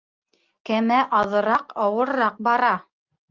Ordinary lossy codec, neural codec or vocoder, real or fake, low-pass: Opus, 32 kbps; none; real; 7.2 kHz